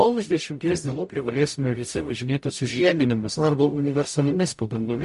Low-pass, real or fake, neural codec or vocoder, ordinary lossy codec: 14.4 kHz; fake; codec, 44.1 kHz, 0.9 kbps, DAC; MP3, 48 kbps